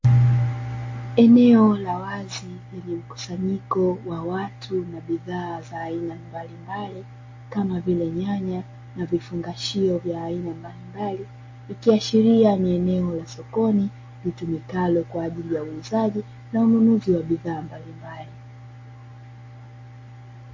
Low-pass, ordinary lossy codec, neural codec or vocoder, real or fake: 7.2 kHz; MP3, 32 kbps; none; real